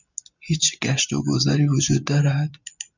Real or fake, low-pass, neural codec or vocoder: real; 7.2 kHz; none